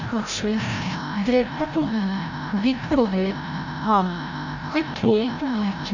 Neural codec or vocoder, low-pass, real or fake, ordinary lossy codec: codec, 16 kHz, 0.5 kbps, FreqCodec, larger model; 7.2 kHz; fake; none